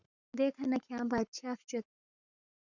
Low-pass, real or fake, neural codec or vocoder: 7.2 kHz; fake; codec, 44.1 kHz, 7.8 kbps, Pupu-Codec